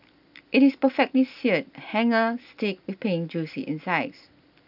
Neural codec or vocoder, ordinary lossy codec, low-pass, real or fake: none; none; 5.4 kHz; real